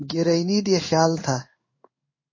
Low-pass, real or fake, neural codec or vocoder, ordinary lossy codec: 7.2 kHz; fake; codec, 16 kHz in and 24 kHz out, 1 kbps, XY-Tokenizer; MP3, 32 kbps